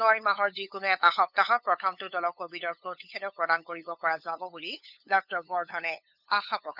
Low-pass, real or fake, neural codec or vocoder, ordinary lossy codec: 5.4 kHz; fake; codec, 16 kHz, 8 kbps, FunCodec, trained on LibriTTS, 25 frames a second; none